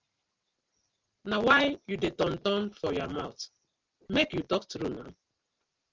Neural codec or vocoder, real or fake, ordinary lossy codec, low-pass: none; real; Opus, 32 kbps; 7.2 kHz